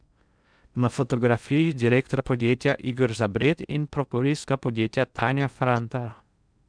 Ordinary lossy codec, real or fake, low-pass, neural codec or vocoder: none; fake; 9.9 kHz; codec, 16 kHz in and 24 kHz out, 0.6 kbps, FocalCodec, streaming, 4096 codes